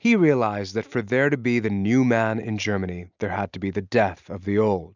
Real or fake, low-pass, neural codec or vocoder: real; 7.2 kHz; none